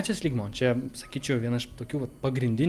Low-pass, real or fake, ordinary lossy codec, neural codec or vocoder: 14.4 kHz; real; Opus, 32 kbps; none